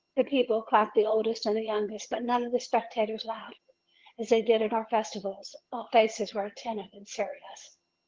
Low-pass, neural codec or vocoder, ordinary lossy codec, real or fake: 7.2 kHz; vocoder, 22.05 kHz, 80 mel bands, HiFi-GAN; Opus, 16 kbps; fake